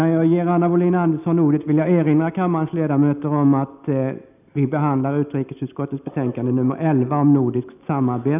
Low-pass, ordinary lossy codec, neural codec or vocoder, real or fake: 3.6 kHz; none; none; real